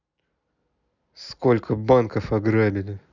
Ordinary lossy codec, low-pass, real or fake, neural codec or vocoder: none; 7.2 kHz; real; none